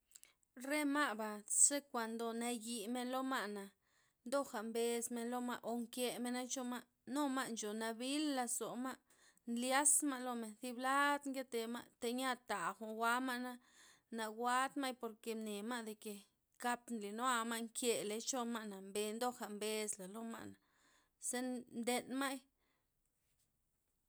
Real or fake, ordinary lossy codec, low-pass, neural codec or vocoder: real; none; none; none